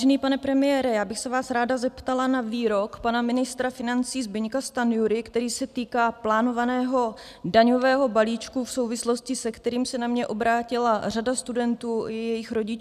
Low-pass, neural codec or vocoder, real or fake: 14.4 kHz; none; real